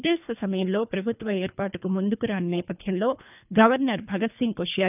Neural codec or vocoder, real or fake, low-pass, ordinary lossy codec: codec, 24 kHz, 3 kbps, HILCodec; fake; 3.6 kHz; none